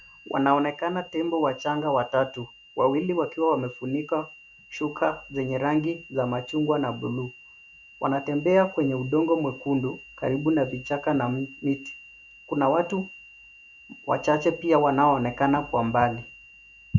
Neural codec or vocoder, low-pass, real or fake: none; 7.2 kHz; real